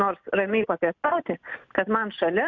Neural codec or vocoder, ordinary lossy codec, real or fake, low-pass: none; MP3, 64 kbps; real; 7.2 kHz